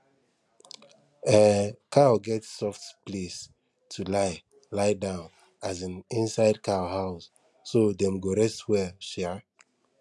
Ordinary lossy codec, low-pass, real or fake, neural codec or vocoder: none; none; real; none